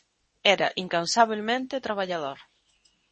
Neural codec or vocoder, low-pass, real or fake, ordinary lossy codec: codec, 24 kHz, 0.9 kbps, WavTokenizer, medium speech release version 2; 10.8 kHz; fake; MP3, 32 kbps